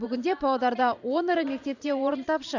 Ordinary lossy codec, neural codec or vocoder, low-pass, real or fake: none; vocoder, 44.1 kHz, 128 mel bands every 512 samples, BigVGAN v2; 7.2 kHz; fake